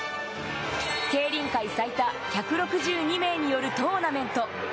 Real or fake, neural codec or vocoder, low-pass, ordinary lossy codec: real; none; none; none